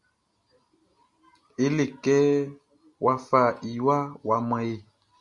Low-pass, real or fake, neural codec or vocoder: 10.8 kHz; real; none